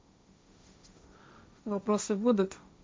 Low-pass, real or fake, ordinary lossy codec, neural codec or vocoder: none; fake; none; codec, 16 kHz, 1.1 kbps, Voila-Tokenizer